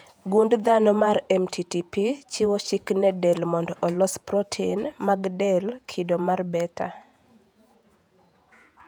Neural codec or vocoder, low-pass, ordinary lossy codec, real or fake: vocoder, 48 kHz, 128 mel bands, Vocos; 19.8 kHz; none; fake